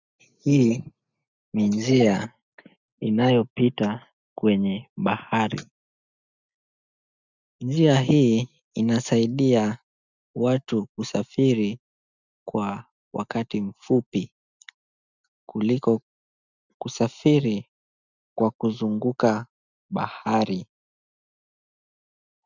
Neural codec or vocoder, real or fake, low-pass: none; real; 7.2 kHz